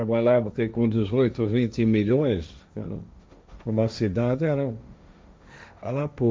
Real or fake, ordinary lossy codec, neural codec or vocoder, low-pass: fake; none; codec, 16 kHz, 1.1 kbps, Voila-Tokenizer; none